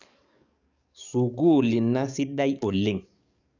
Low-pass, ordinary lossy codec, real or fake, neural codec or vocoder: 7.2 kHz; none; fake; codec, 44.1 kHz, 7.8 kbps, DAC